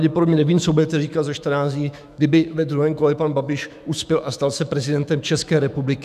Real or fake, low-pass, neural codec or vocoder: fake; 14.4 kHz; autoencoder, 48 kHz, 128 numbers a frame, DAC-VAE, trained on Japanese speech